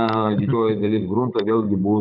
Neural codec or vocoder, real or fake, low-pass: codec, 16 kHz, 16 kbps, FunCodec, trained on Chinese and English, 50 frames a second; fake; 5.4 kHz